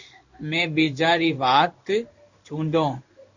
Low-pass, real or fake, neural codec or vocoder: 7.2 kHz; fake; codec, 16 kHz in and 24 kHz out, 1 kbps, XY-Tokenizer